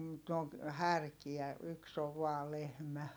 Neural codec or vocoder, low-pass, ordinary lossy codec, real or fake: none; none; none; real